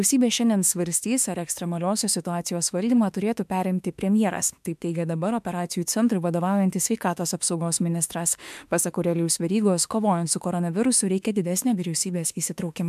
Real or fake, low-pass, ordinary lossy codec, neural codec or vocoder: fake; 14.4 kHz; MP3, 96 kbps; autoencoder, 48 kHz, 32 numbers a frame, DAC-VAE, trained on Japanese speech